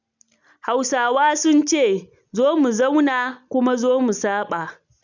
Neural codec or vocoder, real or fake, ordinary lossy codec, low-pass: none; real; none; 7.2 kHz